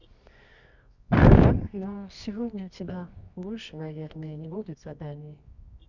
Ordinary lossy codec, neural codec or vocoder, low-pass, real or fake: none; codec, 24 kHz, 0.9 kbps, WavTokenizer, medium music audio release; 7.2 kHz; fake